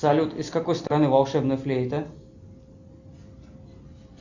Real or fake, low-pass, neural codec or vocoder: real; 7.2 kHz; none